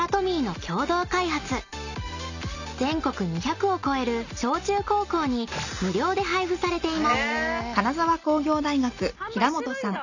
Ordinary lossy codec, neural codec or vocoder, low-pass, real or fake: none; none; 7.2 kHz; real